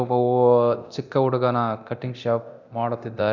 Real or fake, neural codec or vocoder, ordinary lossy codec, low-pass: fake; codec, 24 kHz, 0.9 kbps, DualCodec; none; 7.2 kHz